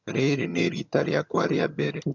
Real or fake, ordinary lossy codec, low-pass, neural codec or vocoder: fake; none; 7.2 kHz; vocoder, 22.05 kHz, 80 mel bands, HiFi-GAN